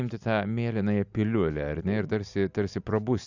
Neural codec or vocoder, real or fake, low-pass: none; real; 7.2 kHz